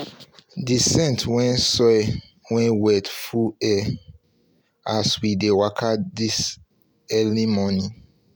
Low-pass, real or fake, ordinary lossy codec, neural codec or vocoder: none; real; none; none